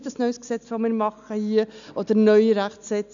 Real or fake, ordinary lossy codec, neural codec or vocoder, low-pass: real; none; none; 7.2 kHz